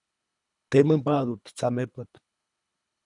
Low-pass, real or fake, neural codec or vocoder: 10.8 kHz; fake; codec, 24 kHz, 3 kbps, HILCodec